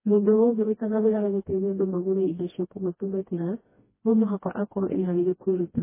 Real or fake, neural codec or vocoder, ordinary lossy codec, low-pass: fake; codec, 16 kHz, 1 kbps, FreqCodec, smaller model; MP3, 16 kbps; 3.6 kHz